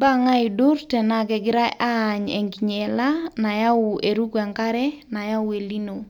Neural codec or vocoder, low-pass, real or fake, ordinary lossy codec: none; 19.8 kHz; real; none